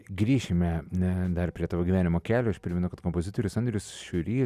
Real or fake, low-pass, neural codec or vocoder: real; 14.4 kHz; none